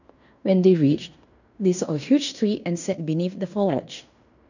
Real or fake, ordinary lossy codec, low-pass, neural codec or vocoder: fake; none; 7.2 kHz; codec, 16 kHz in and 24 kHz out, 0.9 kbps, LongCat-Audio-Codec, fine tuned four codebook decoder